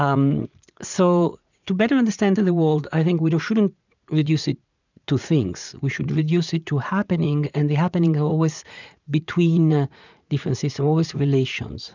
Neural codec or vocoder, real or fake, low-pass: vocoder, 44.1 kHz, 80 mel bands, Vocos; fake; 7.2 kHz